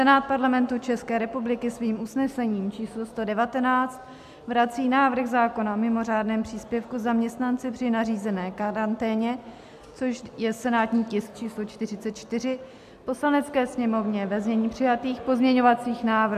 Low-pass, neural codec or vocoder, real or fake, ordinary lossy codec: 14.4 kHz; none; real; AAC, 96 kbps